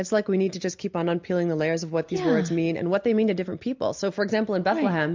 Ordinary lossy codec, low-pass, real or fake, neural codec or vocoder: MP3, 64 kbps; 7.2 kHz; real; none